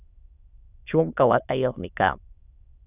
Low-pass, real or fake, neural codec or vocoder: 3.6 kHz; fake; autoencoder, 22.05 kHz, a latent of 192 numbers a frame, VITS, trained on many speakers